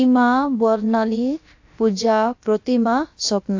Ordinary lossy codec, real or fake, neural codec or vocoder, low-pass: AAC, 48 kbps; fake; codec, 16 kHz, about 1 kbps, DyCAST, with the encoder's durations; 7.2 kHz